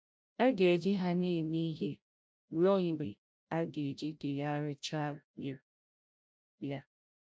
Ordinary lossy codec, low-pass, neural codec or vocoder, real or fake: none; none; codec, 16 kHz, 0.5 kbps, FreqCodec, larger model; fake